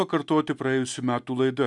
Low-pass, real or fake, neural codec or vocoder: 10.8 kHz; real; none